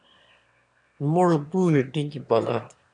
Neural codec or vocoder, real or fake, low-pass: autoencoder, 22.05 kHz, a latent of 192 numbers a frame, VITS, trained on one speaker; fake; 9.9 kHz